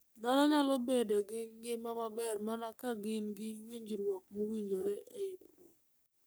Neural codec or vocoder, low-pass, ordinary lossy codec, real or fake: codec, 44.1 kHz, 3.4 kbps, Pupu-Codec; none; none; fake